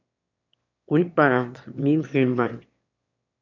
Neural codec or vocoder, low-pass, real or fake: autoencoder, 22.05 kHz, a latent of 192 numbers a frame, VITS, trained on one speaker; 7.2 kHz; fake